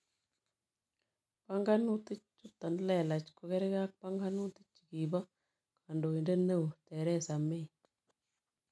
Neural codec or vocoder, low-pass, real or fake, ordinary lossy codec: none; none; real; none